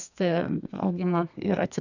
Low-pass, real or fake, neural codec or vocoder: 7.2 kHz; fake; codec, 32 kHz, 1.9 kbps, SNAC